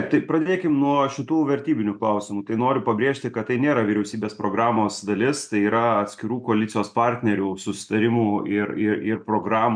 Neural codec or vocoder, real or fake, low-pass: none; real; 9.9 kHz